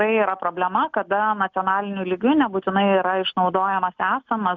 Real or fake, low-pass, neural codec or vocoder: real; 7.2 kHz; none